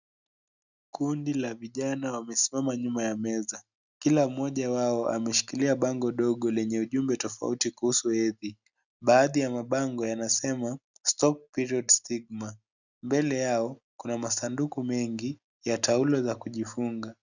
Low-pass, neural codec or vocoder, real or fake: 7.2 kHz; none; real